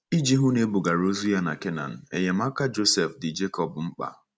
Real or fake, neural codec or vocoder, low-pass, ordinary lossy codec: real; none; none; none